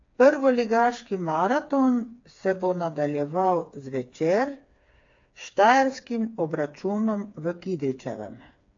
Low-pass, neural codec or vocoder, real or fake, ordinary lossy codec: 7.2 kHz; codec, 16 kHz, 4 kbps, FreqCodec, smaller model; fake; AAC, 48 kbps